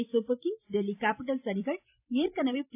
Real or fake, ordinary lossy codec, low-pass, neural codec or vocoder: fake; AAC, 24 kbps; 3.6 kHz; vocoder, 44.1 kHz, 128 mel bands every 256 samples, BigVGAN v2